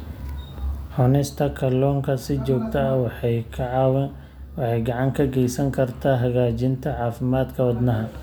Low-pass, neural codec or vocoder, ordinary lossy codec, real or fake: none; none; none; real